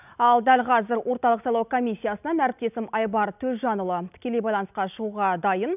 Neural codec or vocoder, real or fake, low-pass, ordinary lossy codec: none; real; 3.6 kHz; none